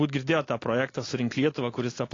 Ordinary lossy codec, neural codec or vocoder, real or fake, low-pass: AAC, 32 kbps; none; real; 7.2 kHz